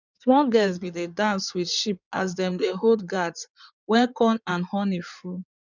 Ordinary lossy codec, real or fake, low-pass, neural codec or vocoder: none; fake; 7.2 kHz; codec, 16 kHz in and 24 kHz out, 2.2 kbps, FireRedTTS-2 codec